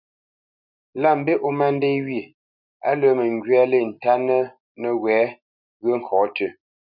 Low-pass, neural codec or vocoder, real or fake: 5.4 kHz; none; real